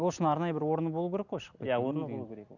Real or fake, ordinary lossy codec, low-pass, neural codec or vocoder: real; none; 7.2 kHz; none